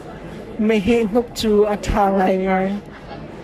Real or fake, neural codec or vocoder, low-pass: fake; codec, 44.1 kHz, 3.4 kbps, Pupu-Codec; 14.4 kHz